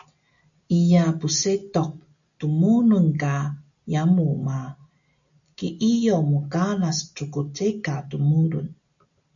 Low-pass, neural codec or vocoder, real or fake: 7.2 kHz; none; real